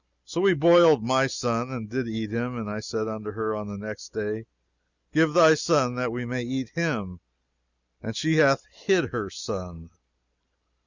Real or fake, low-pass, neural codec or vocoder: real; 7.2 kHz; none